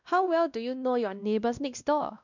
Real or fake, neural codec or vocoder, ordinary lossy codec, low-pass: fake; codec, 16 kHz, 1 kbps, X-Codec, WavLM features, trained on Multilingual LibriSpeech; none; 7.2 kHz